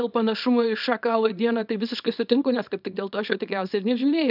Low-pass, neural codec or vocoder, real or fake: 5.4 kHz; codec, 24 kHz, 0.9 kbps, WavTokenizer, small release; fake